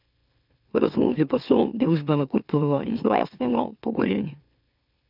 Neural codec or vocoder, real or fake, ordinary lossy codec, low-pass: autoencoder, 44.1 kHz, a latent of 192 numbers a frame, MeloTTS; fake; none; 5.4 kHz